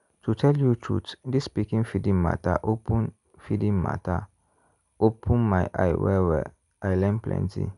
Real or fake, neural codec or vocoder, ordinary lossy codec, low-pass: real; none; none; 10.8 kHz